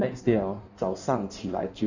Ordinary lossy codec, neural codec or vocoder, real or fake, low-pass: none; codec, 16 kHz in and 24 kHz out, 1.1 kbps, FireRedTTS-2 codec; fake; 7.2 kHz